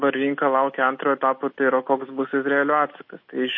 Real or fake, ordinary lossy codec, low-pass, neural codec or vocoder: real; MP3, 32 kbps; 7.2 kHz; none